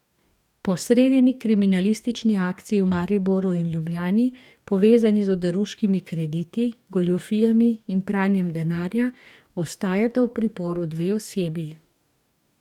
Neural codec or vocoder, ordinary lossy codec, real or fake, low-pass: codec, 44.1 kHz, 2.6 kbps, DAC; none; fake; 19.8 kHz